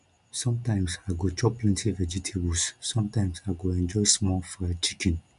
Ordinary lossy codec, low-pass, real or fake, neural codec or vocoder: none; 10.8 kHz; real; none